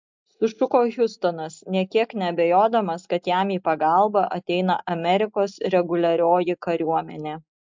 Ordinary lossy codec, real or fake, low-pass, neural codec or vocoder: MP3, 64 kbps; real; 7.2 kHz; none